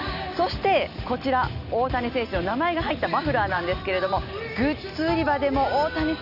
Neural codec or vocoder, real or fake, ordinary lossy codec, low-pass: none; real; none; 5.4 kHz